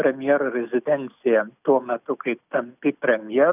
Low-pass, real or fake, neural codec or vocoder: 3.6 kHz; real; none